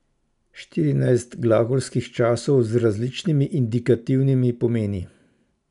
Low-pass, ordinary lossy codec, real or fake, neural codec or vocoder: 10.8 kHz; none; real; none